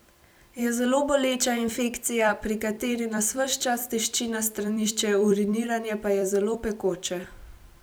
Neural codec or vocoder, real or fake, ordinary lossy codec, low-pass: vocoder, 44.1 kHz, 128 mel bands every 256 samples, BigVGAN v2; fake; none; none